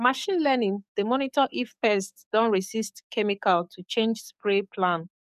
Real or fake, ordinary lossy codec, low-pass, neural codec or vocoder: fake; none; 14.4 kHz; codec, 44.1 kHz, 7.8 kbps, DAC